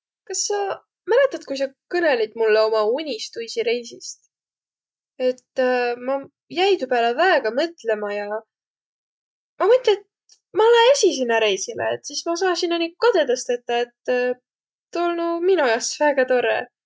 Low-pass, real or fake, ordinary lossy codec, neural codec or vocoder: none; real; none; none